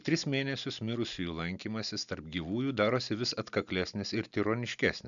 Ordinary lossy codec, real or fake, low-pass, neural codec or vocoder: MP3, 96 kbps; real; 7.2 kHz; none